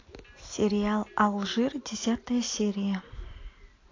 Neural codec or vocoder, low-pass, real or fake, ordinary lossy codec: none; 7.2 kHz; real; AAC, 32 kbps